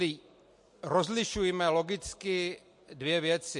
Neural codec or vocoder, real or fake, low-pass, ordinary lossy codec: none; real; 10.8 kHz; MP3, 48 kbps